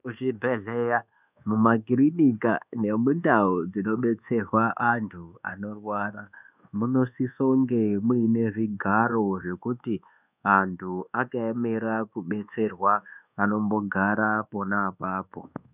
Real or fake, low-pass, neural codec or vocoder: fake; 3.6 kHz; codec, 24 kHz, 1.2 kbps, DualCodec